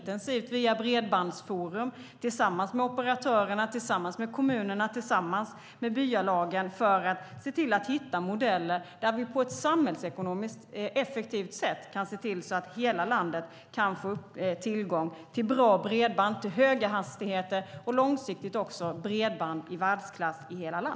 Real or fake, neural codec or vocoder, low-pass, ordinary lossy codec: real; none; none; none